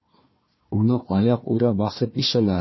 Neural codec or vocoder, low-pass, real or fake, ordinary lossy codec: codec, 16 kHz, 1 kbps, FunCodec, trained on Chinese and English, 50 frames a second; 7.2 kHz; fake; MP3, 24 kbps